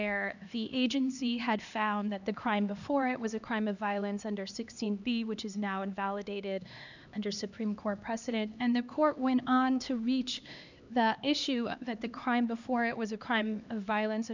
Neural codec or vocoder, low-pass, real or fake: codec, 16 kHz, 2 kbps, X-Codec, HuBERT features, trained on LibriSpeech; 7.2 kHz; fake